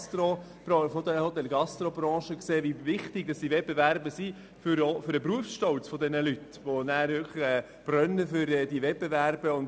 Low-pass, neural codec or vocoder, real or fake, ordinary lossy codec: none; none; real; none